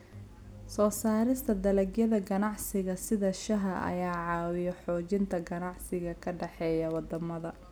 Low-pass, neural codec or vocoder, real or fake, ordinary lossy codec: none; none; real; none